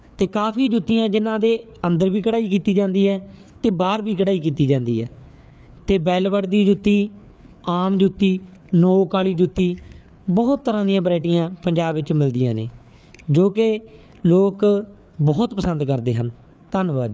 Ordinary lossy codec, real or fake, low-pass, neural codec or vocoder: none; fake; none; codec, 16 kHz, 4 kbps, FreqCodec, larger model